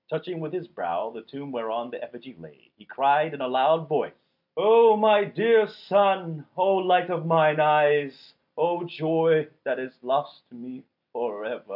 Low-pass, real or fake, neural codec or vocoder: 5.4 kHz; real; none